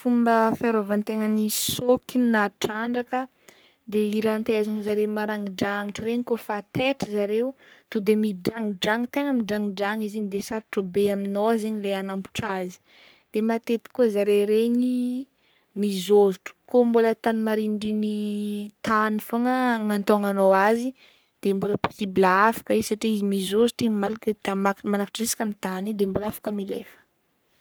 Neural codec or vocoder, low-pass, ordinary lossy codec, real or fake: codec, 44.1 kHz, 3.4 kbps, Pupu-Codec; none; none; fake